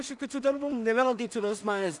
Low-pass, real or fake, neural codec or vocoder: 10.8 kHz; fake; codec, 16 kHz in and 24 kHz out, 0.4 kbps, LongCat-Audio-Codec, two codebook decoder